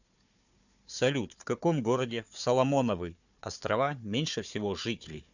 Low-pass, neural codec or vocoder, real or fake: 7.2 kHz; codec, 16 kHz, 4 kbps, FunCodec, trained on Chinese and English, 50 frames a second; fake